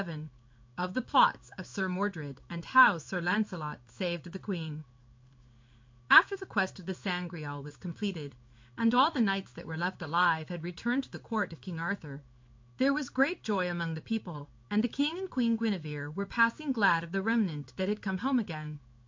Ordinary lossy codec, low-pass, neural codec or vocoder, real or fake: MP3, 48 kbps; 7.2 kHz; codec, 16 kHz in and 24 kHz out, 1 kbps, XY-Tokenizer; fake